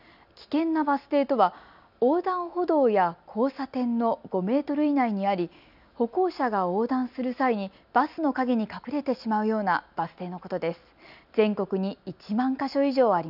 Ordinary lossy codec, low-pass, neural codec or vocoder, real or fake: none; 5.4 kHz; none; real